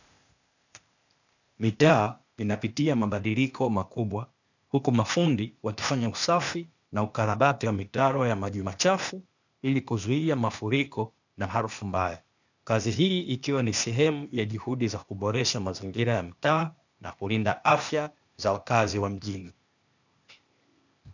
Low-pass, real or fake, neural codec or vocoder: 7.2 kHz; fake; codec, 16 kHz, 0.8 kbps, ZipCodec